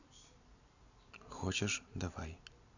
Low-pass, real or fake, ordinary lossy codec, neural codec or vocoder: 7.2 kHz; real; none; none